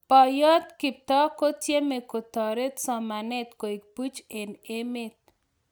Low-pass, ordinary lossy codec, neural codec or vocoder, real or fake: none; none; none; real